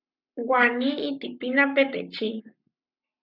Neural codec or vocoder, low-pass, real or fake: codec, 44.1 kHz, 7.8 kbps, Pupu-Codec; 5.4 kHz; fake